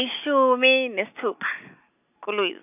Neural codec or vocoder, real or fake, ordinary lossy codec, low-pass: none; real; none; 3.6 kHz